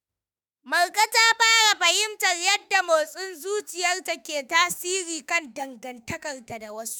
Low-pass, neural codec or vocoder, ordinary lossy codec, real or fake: none; autoencoder, 48 kHz, 32 numbers a frame, DAC-VAE, trained on Japanese speech; none; fake